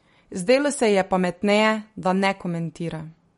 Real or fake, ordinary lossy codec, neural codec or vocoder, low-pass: real; MP3, 48 kbps; none; 10.8 kHz